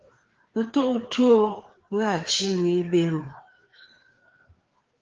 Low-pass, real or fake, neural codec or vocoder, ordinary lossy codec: 7.2 kHz; fake; codec, 16 kHz, 2 kbps, FreqCodec, larger model; Opus, 16 kbps